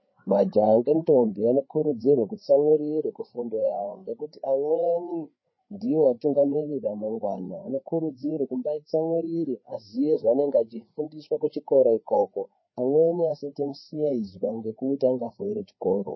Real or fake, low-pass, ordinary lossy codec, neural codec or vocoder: fake; 7.2 kHz; MP3, 24 kbps; codec, 16 kHz, 4 kbps, FreqCodec, larger model